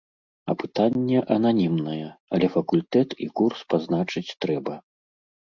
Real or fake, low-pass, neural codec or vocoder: real; 7.2 kHz; none